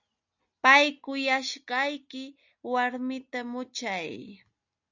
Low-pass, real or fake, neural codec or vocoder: 7.2 kHz; real; none